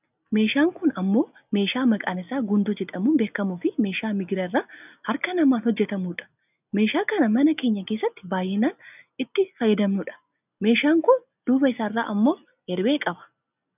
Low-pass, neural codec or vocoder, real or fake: 3.6 kHz; none; real